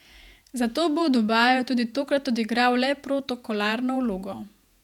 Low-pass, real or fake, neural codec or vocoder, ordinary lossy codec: 19.8 kHz; fake; vocoder, 48 kHz, 128 mel bands, Vocos; none